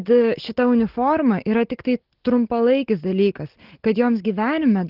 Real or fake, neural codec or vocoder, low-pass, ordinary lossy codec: real; none; 5.4 kHz; Opus, 16 kbps